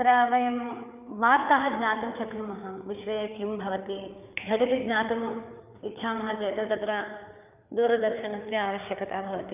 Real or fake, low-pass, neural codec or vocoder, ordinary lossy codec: fake; 3.6 kHz; codec, 16 kHz, 4 kbps, FreqCodec, larger model; none